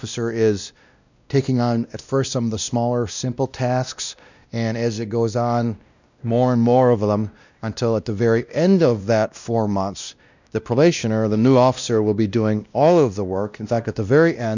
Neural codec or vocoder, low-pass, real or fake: codec, 16 kHz, 1 kbps, X-Codec, WavLM features, trained on Multilingual LibriSpeech; 7.2 kHz; fake